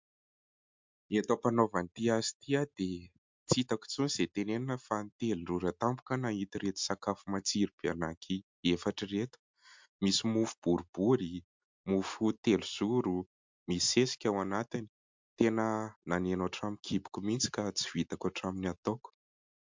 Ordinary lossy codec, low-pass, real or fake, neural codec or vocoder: MP3, 64 kbps; 7.2 kHz; real; none